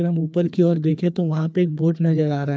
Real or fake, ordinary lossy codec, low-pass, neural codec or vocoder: fake; none; none; codec, 16 kHz, 2 kbps, FreqCodec, larger model